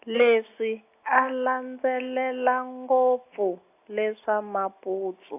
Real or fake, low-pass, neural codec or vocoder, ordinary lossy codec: real; 3.6 kHz; none; AAC, 24 kbps